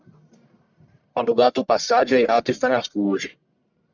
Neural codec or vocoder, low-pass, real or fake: codec, 44.1 kHz, 1.7 kbps, Pupu-Codec; 7.2 kHz; fake